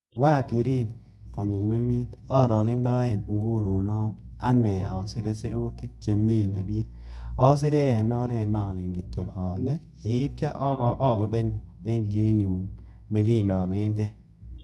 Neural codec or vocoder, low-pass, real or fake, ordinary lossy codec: codec, 24 kHz, 0.9 kbps, WavTokenizer, medium music audio release; none; fake; none